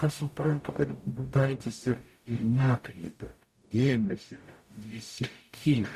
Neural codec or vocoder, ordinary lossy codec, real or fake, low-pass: codec, 44.1 kHz, 0.9 kbps, DAC; Opus, 64 kbps; fake; 14.4 kHz